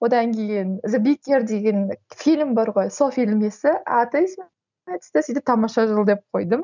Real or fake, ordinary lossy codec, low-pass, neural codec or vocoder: real; none; 7.2 kHz; none